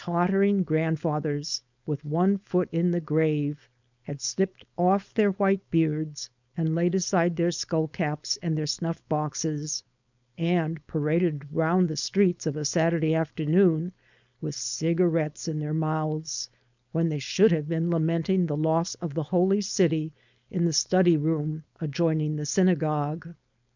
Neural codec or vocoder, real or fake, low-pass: codec, 16 kHz, 4.8 kbps, FACodec; fake; 7.2 kHz